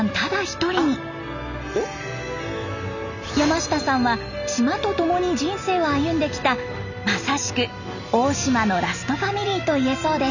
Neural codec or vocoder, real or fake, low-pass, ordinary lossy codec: none; real; 7.2 kHz; none